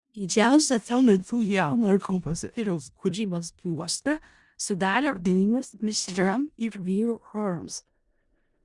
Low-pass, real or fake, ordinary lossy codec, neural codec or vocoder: 10.8 kHz; fake; Opus, 64 kbps; codec, 16 kHz in and 24 kHz out, 0.4 kbps, LongCat-Audio-Codec, four codebook decoder